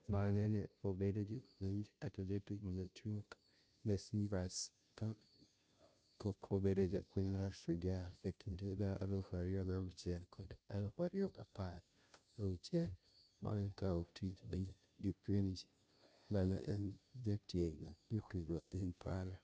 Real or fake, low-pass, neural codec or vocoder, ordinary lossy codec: fake; none; codec, 16 kHz, 0.5 kbps, FunCodec, trained on Chinese and English, 25 frames a second; none